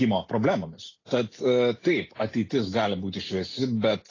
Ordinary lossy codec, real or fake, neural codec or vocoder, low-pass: AAC, 32 kbps; real; none; 7.2 kHz